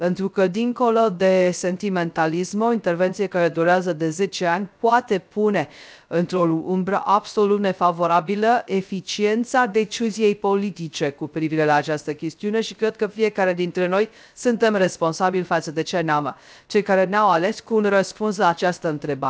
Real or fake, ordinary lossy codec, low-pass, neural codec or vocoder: fake; none; none; codec, 16 kHz, 0.3 kbps, FocalCodec